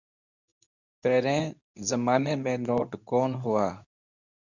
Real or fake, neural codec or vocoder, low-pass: fake; codec, 24 kHz, 0.9 kbps, WavTokenizer, medium speech release version 2; 7.2 kHz